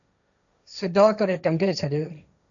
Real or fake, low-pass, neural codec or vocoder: fake; 7.2 kHz; codec, 16 kHz, 1.1 kbps, Voila-Tokenizer